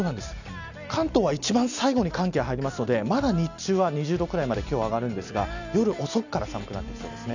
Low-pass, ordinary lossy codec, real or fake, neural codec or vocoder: 7.2 kHz; none; real; none